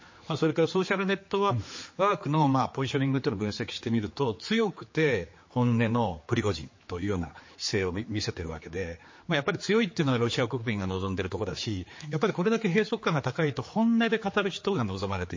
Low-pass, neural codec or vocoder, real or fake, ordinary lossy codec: 7.2 kHz; codec, 16 kHz, 4 kbps, X-Codec, HuBERT features, trained on general audio; fake; MP3, 32 kbps